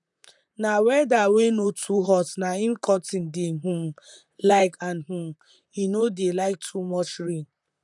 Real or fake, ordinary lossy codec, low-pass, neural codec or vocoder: fake; none; 10.8 kHz; vocoder, 44.1 kHz, 128 mel bands, Pupu-Vocoder